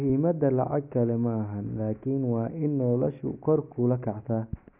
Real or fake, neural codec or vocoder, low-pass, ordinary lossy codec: real; none; 3.6 kHz; none